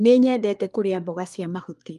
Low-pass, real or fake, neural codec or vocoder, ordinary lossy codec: 10.8 kHz; fake; codec, 24 kHz, 1 kbps, SNAC; Opus, 32 kbps